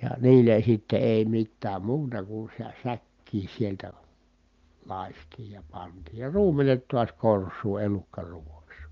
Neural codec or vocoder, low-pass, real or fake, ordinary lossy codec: none; 7.2 kHz; real; Opus, 24 kbps